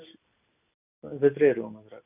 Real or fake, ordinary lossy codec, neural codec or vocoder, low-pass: real; none; none; 3.6 kHz